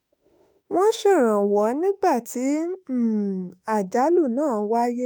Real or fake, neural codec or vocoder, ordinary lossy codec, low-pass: fake; autoencoder, 48 kHz, 32 numbers a frame, DAC-VAE, trained on Japanese speech; none; none